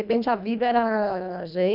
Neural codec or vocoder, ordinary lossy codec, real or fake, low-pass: codec, 24 kHz, 1.5 kbps, HILCodec; none; fake; 5.4 kHz